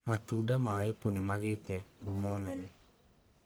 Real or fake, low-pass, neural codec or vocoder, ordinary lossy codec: fake; none; codec, 44.1 kHz, 1.7 kbps, Pupu-Codec; none